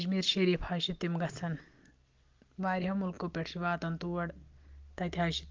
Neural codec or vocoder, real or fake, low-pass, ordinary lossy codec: none; real; 7.2 kHz; Opus, 32 kbps